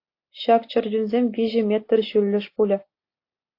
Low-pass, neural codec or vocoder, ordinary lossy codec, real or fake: 5.4 kHz; none; AAC, 32 kbps; real